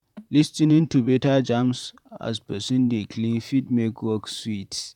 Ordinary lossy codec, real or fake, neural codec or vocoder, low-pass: none; fake; vocoder, 44.1 kHz, 128 mel bands, Pupu-Vocoder; 19.8 kHz